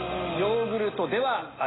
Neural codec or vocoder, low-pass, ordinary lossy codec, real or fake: none; 7.2 kHz; AAC, 16 kbps; real